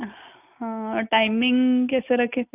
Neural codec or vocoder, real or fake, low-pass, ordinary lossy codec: none; real; 3.6 kHz; none